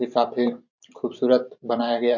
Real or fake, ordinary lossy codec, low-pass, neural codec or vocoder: fake; none; 7.2 kHz; vocoder, 44.1 kHz, 128 mel bands every 512 samples, BigVGAN v2